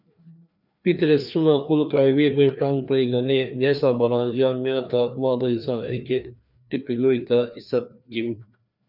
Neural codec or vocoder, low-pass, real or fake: codec, 16 kHz, 2 kbps, FreqCodec, larger model; 5.4 kHz; fake